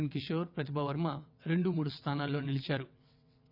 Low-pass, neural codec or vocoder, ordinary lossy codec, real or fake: 5.4 kHz; vocoder, 22.05 kHz, 80 mel bands, WaveNeXt; none; fake